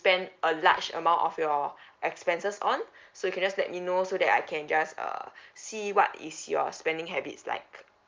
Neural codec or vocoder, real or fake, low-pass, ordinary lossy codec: none; real; 7.2 kHz; Opus, 32 kbps